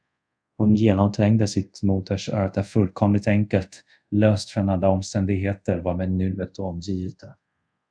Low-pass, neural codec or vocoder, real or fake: 9.9 kHz; codec, 24 kHz, 0.5 kbps, DualCodec; fake